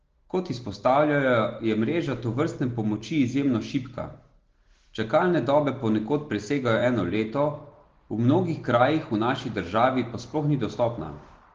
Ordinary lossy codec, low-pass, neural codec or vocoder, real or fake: Opus, 16 kbps; 7.2 kHz; none; real